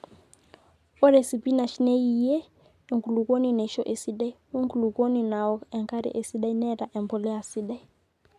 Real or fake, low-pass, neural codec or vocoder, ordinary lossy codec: real; 14.4 kHz; none; none